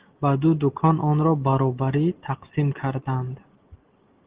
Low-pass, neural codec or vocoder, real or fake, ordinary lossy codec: 3.6 kHz; none; real; Opus, 16 kbps